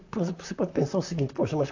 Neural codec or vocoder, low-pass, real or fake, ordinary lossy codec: vocoder, 44.1 kHz, 128 mel bands, Pupu-Vocoder; 7.2 kHz; fake; AAC, 48 kbps